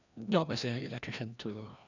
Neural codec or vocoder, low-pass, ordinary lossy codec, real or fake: codec, 16 kHz, 1 kbps, FreqCodec, larger model; 7.2 kHz; none; fake